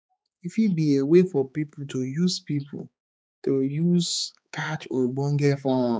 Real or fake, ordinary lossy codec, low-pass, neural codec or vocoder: fake; none; none; codec, 16 kHz, 4 kbps, X-Codec, HuBERT features, trained on balanced general audio